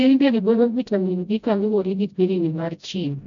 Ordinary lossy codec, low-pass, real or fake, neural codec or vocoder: none; 7.2 kHz; fake; codec, 16 kHz, 0.5 kbps, FreqCodec, smaller model